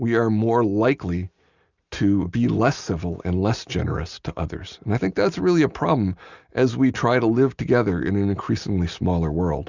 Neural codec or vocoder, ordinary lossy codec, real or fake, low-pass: none; Opus, 64 kbps; real; 7.2 kHz